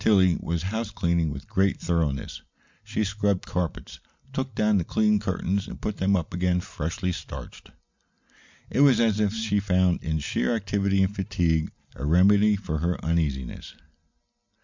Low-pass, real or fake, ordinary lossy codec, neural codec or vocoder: 7.2 kHz; real; MP3, 64 kbps; none